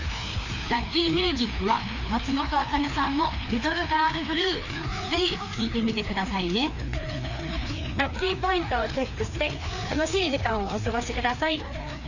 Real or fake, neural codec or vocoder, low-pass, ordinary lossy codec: fake; codec, 16 kHz, 2 kbps, FreqCodec, larger model; 7.2 kHz; none